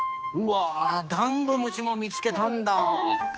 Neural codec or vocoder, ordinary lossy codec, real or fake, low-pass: codec, 16 kHz, 2 kbps, X-Codec, HuBERT features, trained on general audio; none; fake; none